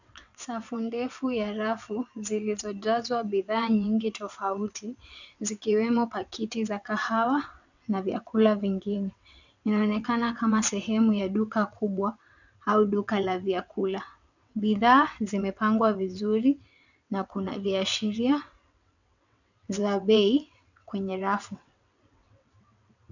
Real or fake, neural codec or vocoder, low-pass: fake; vocoder, 22.05 kHz, 80 mel bands, WaveNeXt; 7.2 kHz